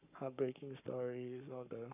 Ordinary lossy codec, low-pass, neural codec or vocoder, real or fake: none; 3.6 kHz; codec, 44.1 kHz, 7.8 kbps, Pupu-Codec; fake